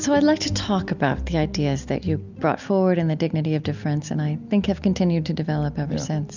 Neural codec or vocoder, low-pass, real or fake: none; 7.2 kHz; real